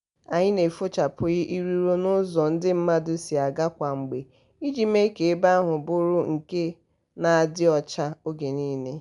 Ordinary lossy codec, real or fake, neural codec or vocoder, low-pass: none; real; none; 10.8 kHz